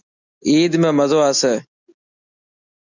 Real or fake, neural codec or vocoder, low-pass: real; none; 7.2 kHz